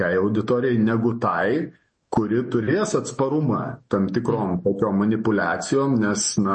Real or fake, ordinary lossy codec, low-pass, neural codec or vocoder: fake; MP3, 32 kbps; 10.8 kHz; autoencoder, 48 kHz, 128 numbers a frame, DAC-VAE, trained on Japanese speech